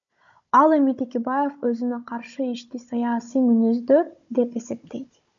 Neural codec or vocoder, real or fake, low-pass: codec, 16 kHz, 16 kbps, FunCodec, trained on Chinese and English, 50 frames a second; fake; 7.2 kHz